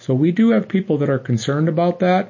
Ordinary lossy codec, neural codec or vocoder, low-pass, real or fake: MP3, 32 kbps; none; 7.2 kHz; real